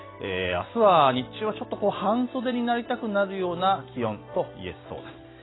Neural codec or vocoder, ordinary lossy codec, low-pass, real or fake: none; AAC, 16 kbps; 7.2 kHz; real